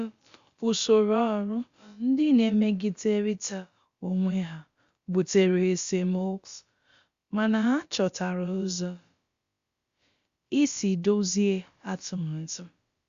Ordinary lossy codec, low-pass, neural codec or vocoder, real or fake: Opus, 64 kbps; 7.2 kHz; codec, 16 kHz, about 1 kbps, DyCAST, with the encoder's durations; fake